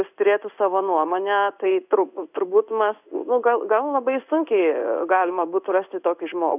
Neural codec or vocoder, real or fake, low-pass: codec, 16 kHz in and 24 kHz out, 1 kbps, XY-Tokenizer; fake; 3.6 kHz